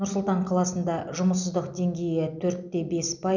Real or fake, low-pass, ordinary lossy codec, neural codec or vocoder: real; 7.2 kHz; none; none